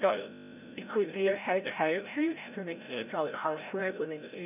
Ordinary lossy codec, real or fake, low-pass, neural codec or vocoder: none; fake; 3.6 kHz; codec, 16 kHz, 0.5 kbps, FreqCodec, larger model